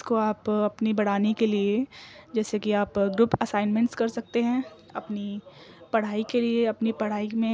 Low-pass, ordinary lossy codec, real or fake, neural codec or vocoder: none; none; real; none